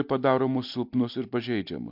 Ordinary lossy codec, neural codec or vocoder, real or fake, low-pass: MP3, 48 kbps; none; real; 5.4 kHz